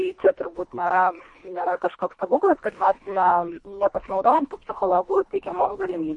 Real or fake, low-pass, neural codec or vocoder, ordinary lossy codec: fake; 10.8 kHz; codec, 24 kHz, 1.5 kbps, HILCodec; MP3, 48 kbps